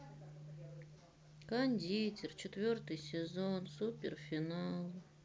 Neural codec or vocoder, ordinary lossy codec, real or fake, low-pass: none; none; real; none